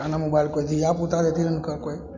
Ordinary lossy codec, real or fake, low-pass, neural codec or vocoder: none; real; 7.2 kHz; none